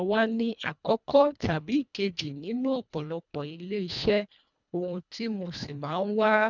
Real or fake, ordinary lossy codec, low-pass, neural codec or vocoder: fake; none; 7.2 kHz; codec, 24 kHz, 1.5 kbps, HILCodec